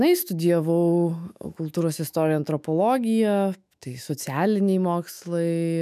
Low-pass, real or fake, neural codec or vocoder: 14.4 kHz; fake; autoencoder, 48 kHz, 128 numbers a frame, DAC-VAE, trained on Japanese speech